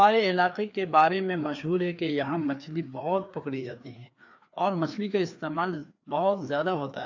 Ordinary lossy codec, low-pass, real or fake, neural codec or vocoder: AAC, 48 kbps; 7.2 kHz; fake; codec, 16 kHz, 2 kbps, FreqCodec, larger model